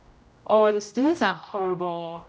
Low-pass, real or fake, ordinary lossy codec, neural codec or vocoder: none; fake; none; codec, 16 kHz, 0.5 kbps, X-Codec, HuBERT features, trained on general audio